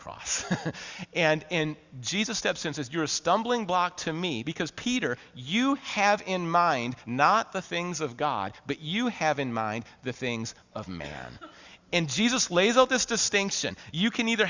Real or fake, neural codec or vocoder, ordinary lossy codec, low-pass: real; none; Opus, 64 kbps; 7.2 kHz